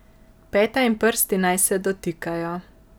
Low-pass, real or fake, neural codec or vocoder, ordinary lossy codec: none; real; none; none